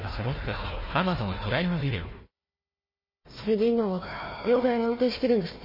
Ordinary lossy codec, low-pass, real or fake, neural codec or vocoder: MP3, 24 kbps; 5.4 kHz; fake; codec, 16 kHz, 1 kbps, FunCodec, trained on Chinese and English, 50 frames a second